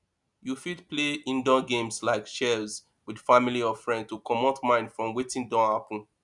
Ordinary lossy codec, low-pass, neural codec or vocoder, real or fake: none; 10.8 kHz; none; real